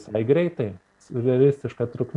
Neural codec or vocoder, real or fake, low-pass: none; real; 10.8 kHz